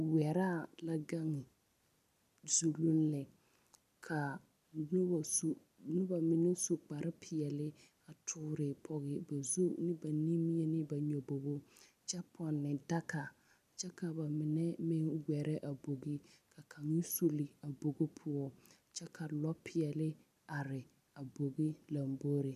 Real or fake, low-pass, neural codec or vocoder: real; 14.4 kHz; none